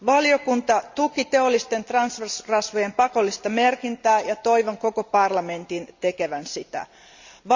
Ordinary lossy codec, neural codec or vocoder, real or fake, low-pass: Opus, 64 kbps; none; real; 7.2 kHz